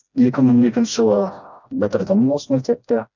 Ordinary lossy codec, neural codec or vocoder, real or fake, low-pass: none; codec, 16 kHz, 1 kbps, FreqCodec, smaller model; fake; 7.2 kHz